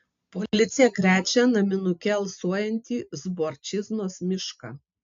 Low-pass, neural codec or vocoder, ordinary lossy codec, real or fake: 7.2 kHz; none; AAC, 64 kbps; real